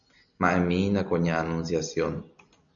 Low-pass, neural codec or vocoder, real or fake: 7.2 kHz; none; real